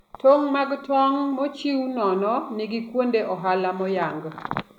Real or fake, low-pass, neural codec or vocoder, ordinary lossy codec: real; 19.8 kHz; none; none